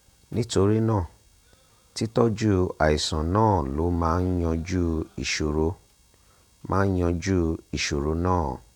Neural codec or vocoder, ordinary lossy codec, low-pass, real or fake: vocoder, 48 kHz, 128 mel bands, Vocos; none; 19.8 kHz; fake